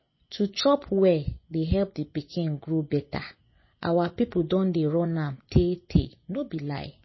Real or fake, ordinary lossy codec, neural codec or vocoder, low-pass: fake; MP3, 24 kbps; vocoder, 24 kHz, 100 mel bands, Vocos; 7.2 kHz